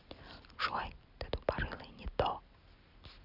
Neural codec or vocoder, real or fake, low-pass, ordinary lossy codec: none; real; 5.4 kHz; none